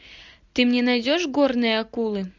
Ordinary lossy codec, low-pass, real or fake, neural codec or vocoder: MP3, 64 kbps; 7.2 kHz; real; none